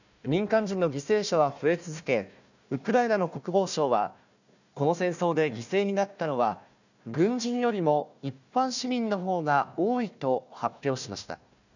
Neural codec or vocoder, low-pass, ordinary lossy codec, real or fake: codec, 16 kHz, 1 kbps, FunCodec, trained on Chinese and English, 50 frames a second; 7.2 kHz; none; fake